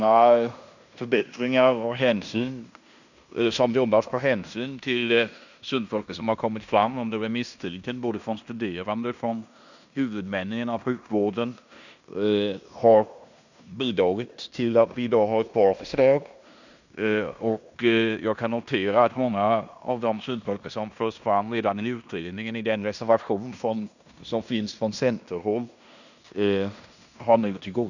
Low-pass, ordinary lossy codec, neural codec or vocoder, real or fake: 7.2 kHz; none; codec, 16 kHz in and 24 kHz out, 0.9 kbps, LongCat-Audio-Codec, fine tuned four codebook decoder; fake